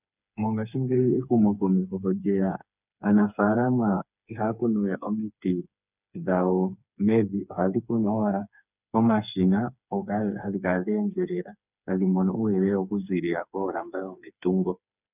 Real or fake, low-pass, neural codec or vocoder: fake; 3.6 kHz; codec, 16 kHz, 4 kbps, FreqCodec, smaller model